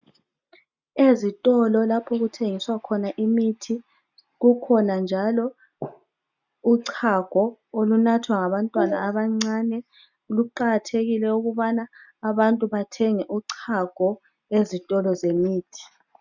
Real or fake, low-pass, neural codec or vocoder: real; 7.2 kHz; none